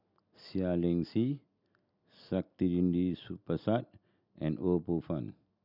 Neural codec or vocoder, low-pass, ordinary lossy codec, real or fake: none; 5.4 kHz; none; real